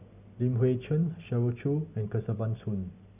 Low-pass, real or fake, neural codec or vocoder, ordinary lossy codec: 3.6 kHz; real; none; none